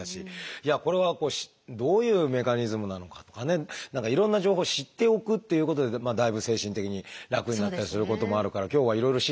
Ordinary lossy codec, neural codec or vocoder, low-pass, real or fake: none; none; none; real